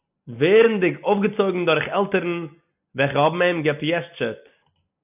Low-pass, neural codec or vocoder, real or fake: 3.6 kHz; none; real